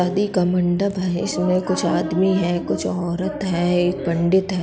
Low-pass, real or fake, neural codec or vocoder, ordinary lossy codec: none; real; none; none